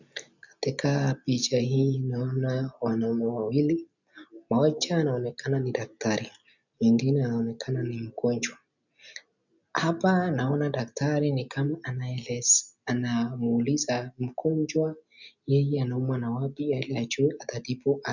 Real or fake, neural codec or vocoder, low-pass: real; none; 7.2 kHz